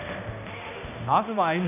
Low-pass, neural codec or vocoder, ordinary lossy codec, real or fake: 3.6 kHz; codec, 16 kHz, 1 kbps, X-Codec, HuBERT features, trained on balanced general audio; none; fake